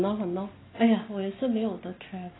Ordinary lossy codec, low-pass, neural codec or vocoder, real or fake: AAC, 16 kbps; 7.2 kHz; none; real